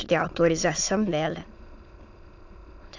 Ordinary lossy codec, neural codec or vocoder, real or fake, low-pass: AAC, 48 kbps; autoencoder, 22.05 kHz, a latent of 192 numbers a frame, VITS, trained on many speakers; fake; 7.2 kHz